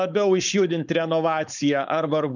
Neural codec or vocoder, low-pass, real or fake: codec, 16 kHz, 4.8 kbps, FACodec; 7.2 kHz; fake